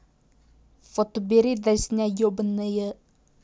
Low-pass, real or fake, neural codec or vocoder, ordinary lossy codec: none; real; none; none